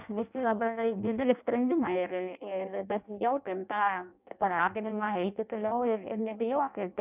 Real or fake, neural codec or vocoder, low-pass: fake; codec, 16 kHz in and 24 kHz out, 0.6 kbps, FireRedTTS-2 codec; 3.6 kHz